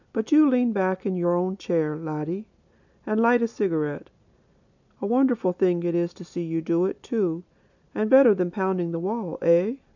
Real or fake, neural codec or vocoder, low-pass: real; none; 7.2 kHz